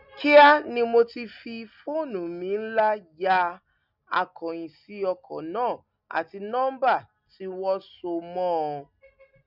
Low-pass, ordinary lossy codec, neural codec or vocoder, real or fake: 5.4 kHz; none; none; real